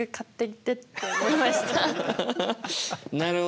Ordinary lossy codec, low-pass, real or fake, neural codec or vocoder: none; none; real; none